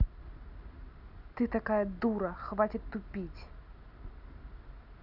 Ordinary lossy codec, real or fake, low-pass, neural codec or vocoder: none; real; 5.4 kHz; none